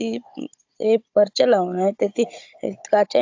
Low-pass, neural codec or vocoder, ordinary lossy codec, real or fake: 7.2 kHz; codec, 16 kHz, 16 kbps, FunCodec, trained on Chinese and English, 50 frames a second; AAC, 48 kbps; fake